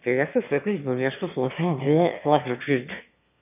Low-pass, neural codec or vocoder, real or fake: 3.6 kHz; autoencoder, 22.05 kHz, a latent of 192 numbers a frame, VITS, trained on one speaker; fake